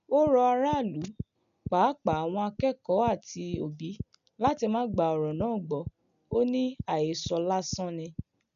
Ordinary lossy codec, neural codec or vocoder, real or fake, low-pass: none; none; real; 7.2 kHz